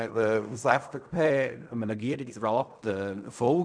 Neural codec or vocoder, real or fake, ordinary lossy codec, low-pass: codec, 16 kHz in and 24 kHz out, 0.4 kbps, LongCat-Audio-Codec, fine tuned four codebook decoder; fake; none; 9.9 kHz